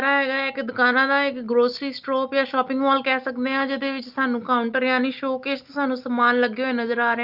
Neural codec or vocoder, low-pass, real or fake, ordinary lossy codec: none; 5.4 kHz; real; Opus, 24 kbps